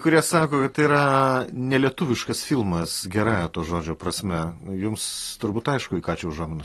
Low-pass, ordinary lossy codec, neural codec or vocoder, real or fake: 19.8 kHz; AAC, 32 kbps; none; real